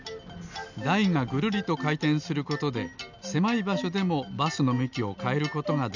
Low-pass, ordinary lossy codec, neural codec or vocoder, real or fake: 7.2 kHz; none; none; real